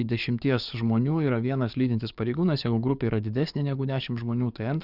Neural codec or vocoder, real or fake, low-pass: codec, 44.1 kHz, 7.8 kbps, DAC; fake; 5.4 kHz